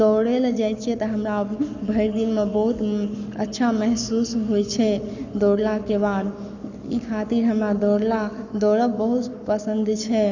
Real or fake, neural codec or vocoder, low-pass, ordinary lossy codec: fake; codec, 44.1 kHz, 7.8 kbps, Pupu-Codec; 7.2 kHz; none